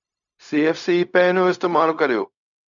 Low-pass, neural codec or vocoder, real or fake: 7.2 kHz; codec, 16 kHz, 0.4 kbps, LongCat-Audio-Codec; fake